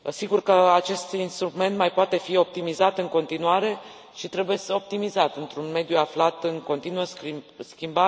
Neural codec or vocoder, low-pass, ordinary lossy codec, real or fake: none; none; none; real